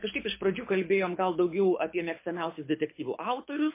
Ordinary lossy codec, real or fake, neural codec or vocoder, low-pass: MP3, 24 kbps; fake; codec, 24 kHz, 6 kbps, HILCodec; 3.6 kHz